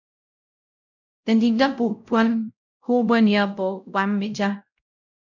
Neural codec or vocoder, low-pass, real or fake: codec, 16 kHz, 0.5 kbps, X-Codec, WavLM features, trained on Multilingual LibriSpeech; 7.2 kHz; fake